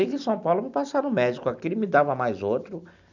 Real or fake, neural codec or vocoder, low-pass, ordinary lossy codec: real; none; 7.2 kHz; none